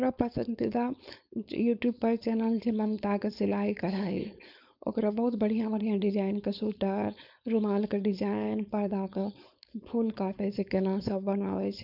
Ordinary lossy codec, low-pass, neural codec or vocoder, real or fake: none; 5.4 kHz; codec, 16 kHz, 4.8 kbps, FACodec; fake